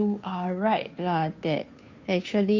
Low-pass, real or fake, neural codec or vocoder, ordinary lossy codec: 7.2 kHz; fake; codec, 16 kHz, 2 kbps, FunCodec, trained on Chinese and English, 25 frames a second; MP3, 64 kbps